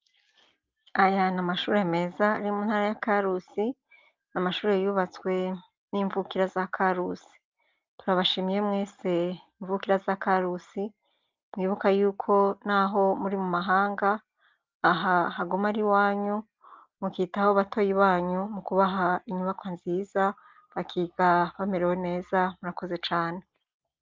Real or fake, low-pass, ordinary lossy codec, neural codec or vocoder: real; 7.2 kHz; Opus, 24 kbps; none